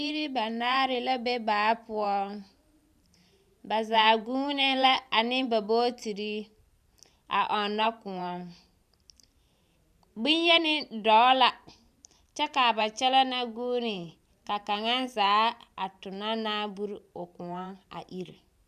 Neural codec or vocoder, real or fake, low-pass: vocoder, 44.1 kHz, 128 mel bands every 512 samples, BigVGAN v2; fake; 14.4 kHz